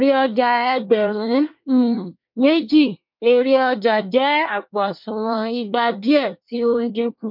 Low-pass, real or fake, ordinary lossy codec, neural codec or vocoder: 5.4 kHz; fake; MP3, 48 kbps; codec, 24 kHz, 1 kbps, SNAC